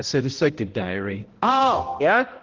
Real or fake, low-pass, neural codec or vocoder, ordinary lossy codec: fake; 7.2 kHz; codec, 16 kHz, 0.5 kbps, X-Codec, HuBERT features, trained on balanced general audio; Opus, 16 kbps